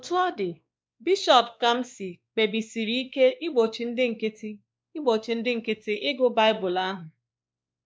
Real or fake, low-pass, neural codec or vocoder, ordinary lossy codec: fake; none; codec, 16 kHz, 2 kbps, X-Codec, WavLM features, trained on Multilingual LibriSpeech; none